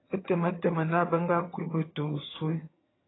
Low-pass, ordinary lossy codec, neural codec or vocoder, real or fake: 7.2 kHz; AAC, 16 kbps; vocoder, 22.05 kHz, 80 mel bands, HiFi-GAN; fake